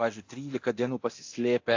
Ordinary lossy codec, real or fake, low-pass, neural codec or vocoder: AAC, 48 kbps; fake; 7.2 kHz; codec, 24 kHz, 0.9 kbps, DualCodec